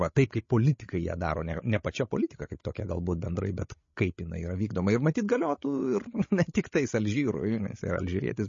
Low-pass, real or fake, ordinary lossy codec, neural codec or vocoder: 7.2 kHz; fake; MP3, 32 kbps; codec, 16 kHz, 16 kbps, FreqCodec, larger model